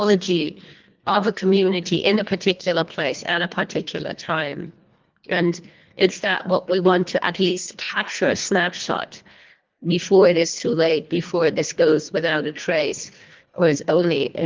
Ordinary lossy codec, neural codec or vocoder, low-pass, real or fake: Opus, 32 kbps; codec, 24 kHz, 1.5 kbps, HILCodec; 7.2 kHz; fake